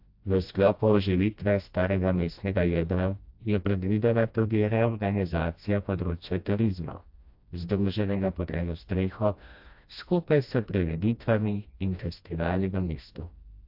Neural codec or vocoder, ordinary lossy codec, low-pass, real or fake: codec, 16 kHz, 1 kbps, FreqCodec, smaller model; AAC, 48 kbps; 5.4 kHz; fake